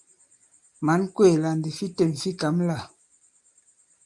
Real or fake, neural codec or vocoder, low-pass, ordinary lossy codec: real; none; 10.8 kHz; Opus, 32 kbps